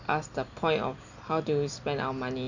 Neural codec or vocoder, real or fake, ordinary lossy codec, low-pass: none; real; none; 7.2 kHz